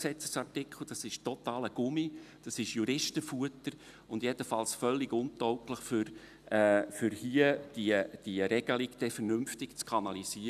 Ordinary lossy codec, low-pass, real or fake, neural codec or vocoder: none; 14.4 kHz; real; none